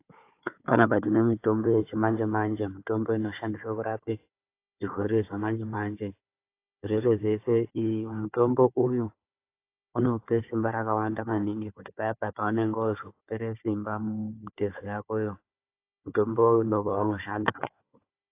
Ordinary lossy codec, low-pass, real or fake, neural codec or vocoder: AAC, 24 kbps; 3.6 kHz; fake; codec, 16 kHz, 4 kbps, FunCodec, trained on Chinese and English, 50 frames a second